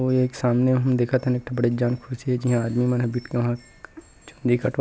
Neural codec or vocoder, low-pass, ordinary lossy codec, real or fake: none; none; none; real